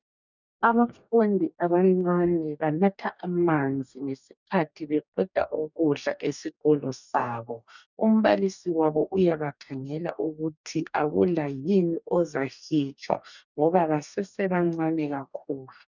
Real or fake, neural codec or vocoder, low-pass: fake; codec, 44.1 kHz, 2.6 kbps, DAC; 7.2 kHz